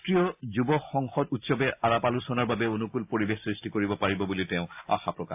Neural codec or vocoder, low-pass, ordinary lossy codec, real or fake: none; 3.6 kHz; AAC, 32 kbps; real